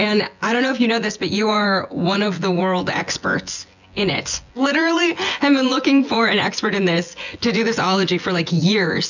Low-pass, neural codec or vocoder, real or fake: 7.2 kHz; vocoder, 24 kHz, 100 mel bands, Vocos; fake